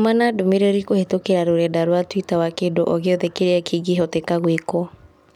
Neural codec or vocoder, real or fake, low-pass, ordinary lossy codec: none; real; 19.8 kHz; none